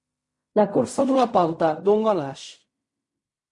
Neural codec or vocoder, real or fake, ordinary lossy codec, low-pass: codec, 16 kHz in and 24 kHz out, 0.4 kbps, LongCat-Audio-Codec, fine tuned four codebook decoder; fake; MP3, 48 kbps; 10.8 kHz